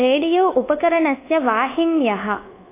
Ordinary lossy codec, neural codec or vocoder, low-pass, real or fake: AAC, 24 kbps; codec, 24 kHz, 1.2 kbps, DualCodec; 3.6 kHz; fake